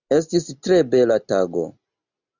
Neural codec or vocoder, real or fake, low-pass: none; real; 7.2 kHz